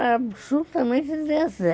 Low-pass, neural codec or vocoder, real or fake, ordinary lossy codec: none; none; real; none